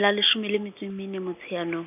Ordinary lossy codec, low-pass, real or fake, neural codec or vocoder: none; 3.6 kHz; real; none